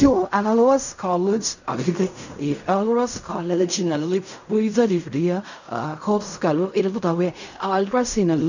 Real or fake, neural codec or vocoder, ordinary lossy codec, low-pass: fake; codec, 16 kHz in and 24 kHz out, 0.4 kbps, LongCat-Audio-Codec, fine tuned four codebook decoder; none; 7.2 kHz